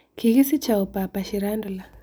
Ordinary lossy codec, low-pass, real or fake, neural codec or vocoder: none; none; real; none